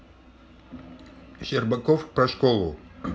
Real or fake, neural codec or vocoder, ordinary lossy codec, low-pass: real; none; none; none